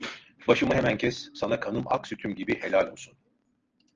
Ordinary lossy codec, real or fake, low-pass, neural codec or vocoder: Opus, 24 kbps; real; 7.2 kHz; none